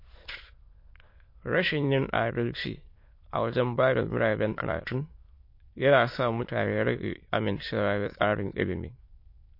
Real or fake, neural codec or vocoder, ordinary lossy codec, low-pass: fake; autoencoder, 22.05 kHz, a latent of 192 numbers a frame, VITS, trained on many speakers; MP3, 32 kbps; 5.4 kHz